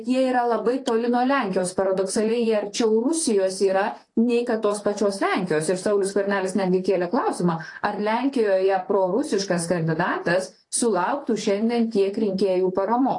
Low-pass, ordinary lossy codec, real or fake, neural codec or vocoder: 10.8 kHz; AAC, 48 kbps; fake; vocoder, 44.1 kHz, 128 mel bands, Pupu-Vocoder